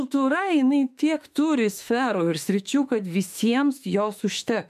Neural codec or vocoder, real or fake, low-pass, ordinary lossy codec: autoencoder, 48 kHz, 32 numbers a frame, DAC-VAE, trained on Japanese speech; fake; 14.4 kHz; MP3, 96 kbps